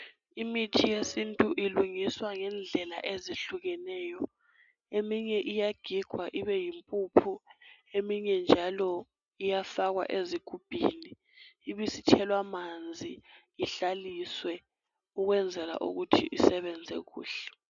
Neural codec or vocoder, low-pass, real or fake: none; 7.2 kHz; real